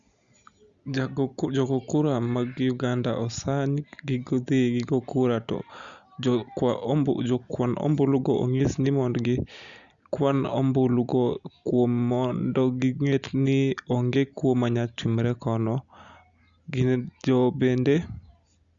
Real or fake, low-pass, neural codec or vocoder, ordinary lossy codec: real; 7.2 kHz; none; Opus, 64 kbps